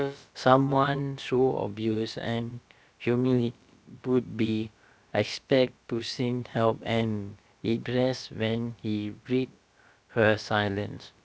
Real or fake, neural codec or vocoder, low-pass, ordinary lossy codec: fake; codec, 16 kHz, about 1 kbps, DyCAST, with the encoder's durations; none; none